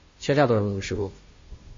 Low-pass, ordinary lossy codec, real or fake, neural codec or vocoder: 7.2 kHz; MP3, 32 kbps; fake; codec, 16 kHz, 0.5 kbps, FunCodec, trained on Chinese and English, 25 frames a second